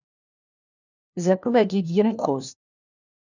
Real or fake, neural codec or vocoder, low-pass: fake; codec, 16 kHz, 1 kbps, FunCodec, trained on LibriTTS, 50 frames a second; 7.2 kHz